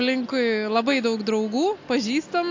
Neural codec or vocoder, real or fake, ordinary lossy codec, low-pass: none; real; AAC, 48 kbps; 7.2 kHz